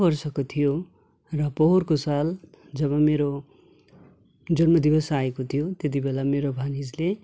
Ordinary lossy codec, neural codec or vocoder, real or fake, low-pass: none; none; real; none